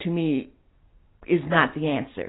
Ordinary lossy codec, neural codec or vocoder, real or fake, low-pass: AAC, 16 kbps; none; real; 7.2 kHz